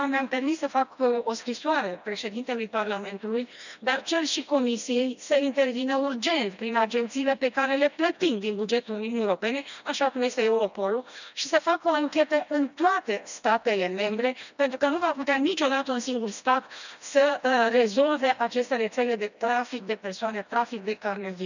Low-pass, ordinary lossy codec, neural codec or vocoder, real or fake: 7.2 kHz; none; codec, 16 kHz, 1 kbps, FreqCodec, smaller model; fake